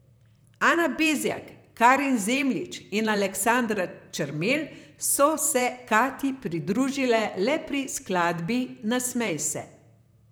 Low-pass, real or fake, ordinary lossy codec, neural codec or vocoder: none; fake; none; vocoder, 44.1 kHz, 128 mel bands, Pupu-Vocoder